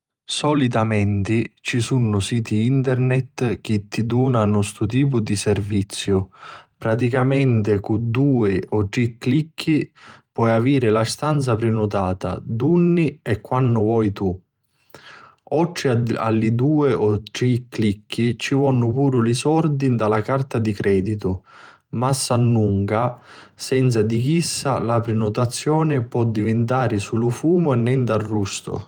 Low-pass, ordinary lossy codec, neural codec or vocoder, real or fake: 19.8 kHz; Opus, 32 kbps; vocoder, 44.1 kHz, 128 mel bands every 512 samples, BigVGAN v2; fake